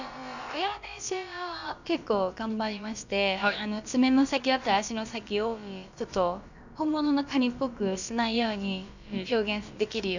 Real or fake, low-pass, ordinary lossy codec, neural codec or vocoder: fake; 7.2 kHz; none; codec, 16 kHz, about 1 kbps, DyCAST, with the encoder's durations